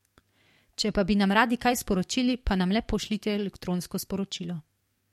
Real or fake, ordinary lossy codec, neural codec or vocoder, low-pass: fake; MP3, 64 kbps; codec, 44.1 kHz, 7.8 kbps, DAC; 19.8 kHz